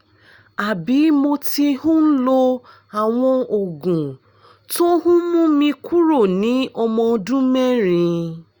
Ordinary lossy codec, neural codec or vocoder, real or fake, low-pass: Opus, 64 kbps; none; real; 19.8 kHz